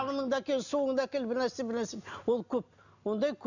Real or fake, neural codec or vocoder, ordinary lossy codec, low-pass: real; none; none; 7.2 kHz